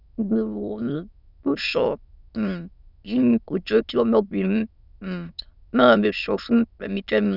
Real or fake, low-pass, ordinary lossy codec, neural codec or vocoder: fake; 5.4 kHz; none; autoencoder, 22.05 kHz, a latent of 192 numbers a frame, VITS, trained on many speakers